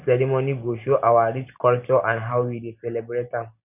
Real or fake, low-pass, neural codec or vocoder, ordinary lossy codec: real; 3.6 kHz; none; none